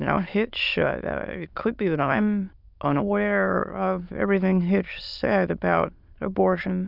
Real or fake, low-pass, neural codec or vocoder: fake; 5.4 kHz; autoencoder, 22.05 kHz, a latent of 192 numbers a frame, VITS, trained on many speakers